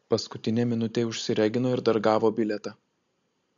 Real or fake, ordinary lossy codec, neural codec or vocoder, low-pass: real; AAC, 64 kbps; none; 7.2 kHz